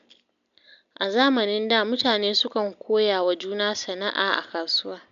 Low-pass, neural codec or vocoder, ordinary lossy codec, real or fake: 7.2 kHz; none; none; real